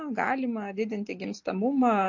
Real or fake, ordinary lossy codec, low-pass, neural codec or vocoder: real; MP3, 48 kbps; 7.2 kHz; none